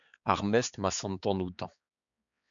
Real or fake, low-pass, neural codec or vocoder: fake; 7.2 kHz; codec, 16 kHz, 4 kbps, X-Codec, HuBERT features, trained on balanced general audio